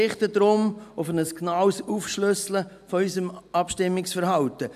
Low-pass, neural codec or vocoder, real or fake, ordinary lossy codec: 14.4 kHz; none; real; AAC, 96 kbps